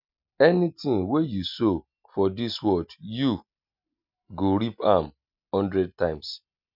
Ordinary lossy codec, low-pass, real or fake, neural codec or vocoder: none; 5.4 kHz; real; none